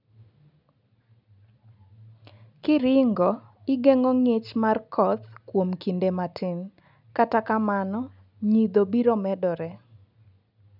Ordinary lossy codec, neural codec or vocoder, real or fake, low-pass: none; none; real; 5.4 kHz